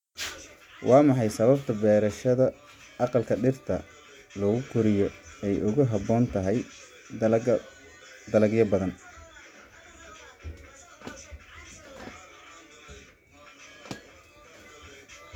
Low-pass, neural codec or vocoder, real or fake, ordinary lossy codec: 19.8 kHz; none; real; MP3, 96 kbps